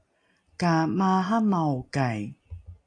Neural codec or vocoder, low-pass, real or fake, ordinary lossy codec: none; 9.9 kHz; real; AAC, 48 kbps